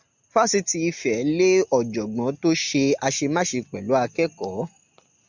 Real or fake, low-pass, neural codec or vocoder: real; 7.2 kHz; none